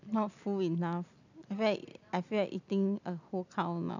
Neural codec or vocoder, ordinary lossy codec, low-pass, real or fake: none; none; 7.2 kHz; real